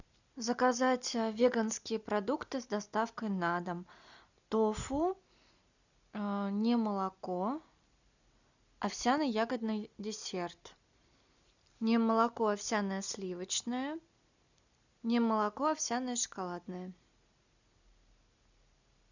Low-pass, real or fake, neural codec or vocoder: 7.2 kHz; real; none